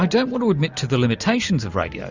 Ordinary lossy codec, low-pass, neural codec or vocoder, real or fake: Opus, 64 kbps; 7.2 kHz; none; real